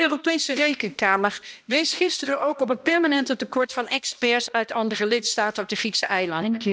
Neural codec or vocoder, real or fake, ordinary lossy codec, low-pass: codec, 16 kHz, 1 kbps, X-Codec, HuBERT features, trained on balanced general audio; fake; none; none